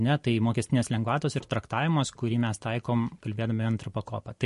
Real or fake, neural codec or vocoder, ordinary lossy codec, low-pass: fake; vocoder, 44.1 kHz, 128 mel bands every 256 samples, BigVGAN v2; MP3, 48 kbps; 14.4 kHz